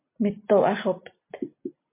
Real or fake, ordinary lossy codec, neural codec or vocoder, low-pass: real; MP3, 24 kbps; none; 3.6 kHz